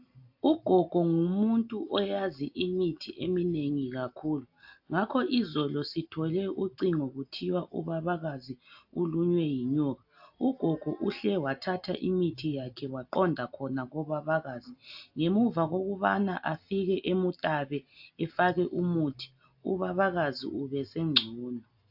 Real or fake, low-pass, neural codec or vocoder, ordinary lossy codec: real; 5.4 kHz; none; AAC, 48 kbps